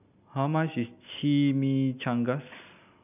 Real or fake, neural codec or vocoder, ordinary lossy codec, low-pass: real; none; none; 3.6 kHz